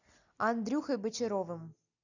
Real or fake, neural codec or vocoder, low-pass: real; none; 7.2 kHz